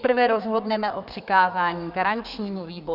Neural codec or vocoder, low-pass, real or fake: codec, 32 kHz, 1.9 kbps, SNAC; 5.4 kHz; fake